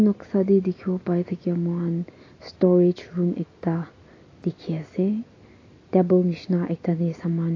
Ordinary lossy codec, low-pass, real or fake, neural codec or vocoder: AAC, 32 kbps; 7.2 kHz; real; none